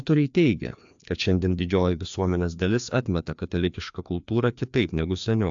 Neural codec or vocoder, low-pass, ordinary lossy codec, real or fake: codec, 16 kHz, 2 kbps, FreqCodec, larger model; 7.2 kHz; AAC, 64 kbps; fake